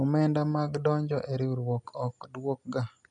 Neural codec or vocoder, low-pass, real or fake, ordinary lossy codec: none; 10.8 kHz; real; none